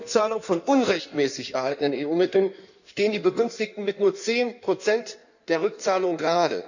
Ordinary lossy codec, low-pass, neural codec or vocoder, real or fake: none; 7.2 kHz; codec, 16 kHz in and 24 kHz out, 1.1 kbps, FireRedTTS-2 codec; fake